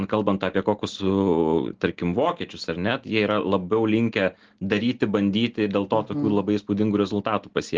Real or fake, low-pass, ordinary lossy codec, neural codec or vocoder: real; 7.2 kHz; Opus, 16 kbps; none